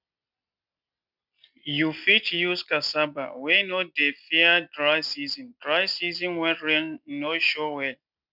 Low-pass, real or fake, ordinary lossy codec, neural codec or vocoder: 5.4 kHz; real; none; none